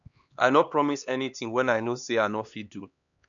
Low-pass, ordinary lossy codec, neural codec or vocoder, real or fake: 7.2 kHz; none; codec, 16 kHz, 2 kbps, X-Codec, HuBERT features, trained on LibriSpeech; fake